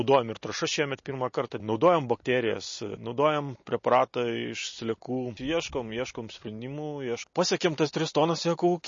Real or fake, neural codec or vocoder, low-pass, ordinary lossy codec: real; none; 7.2 kHz; MP3, 32 kbps